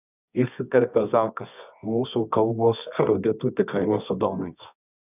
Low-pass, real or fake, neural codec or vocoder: 3.6 kHz; fake; codec, 24 kHz, 0.9 kbps, WavTokenizer, medium music audio release